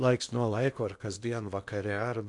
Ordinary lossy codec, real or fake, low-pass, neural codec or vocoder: MP3, 96 kbps; fake; 10.8 kHz; codec, 16 kHz in and 24 kHz out, 0.8 kbps, FocalCodec, streaming, 65536 codes